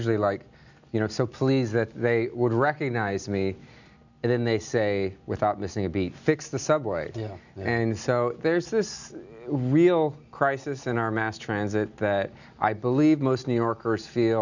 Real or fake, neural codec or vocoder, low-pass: real; none; 7.2 kHz